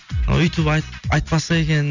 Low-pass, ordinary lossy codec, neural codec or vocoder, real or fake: 7.2 kHz; none; none; real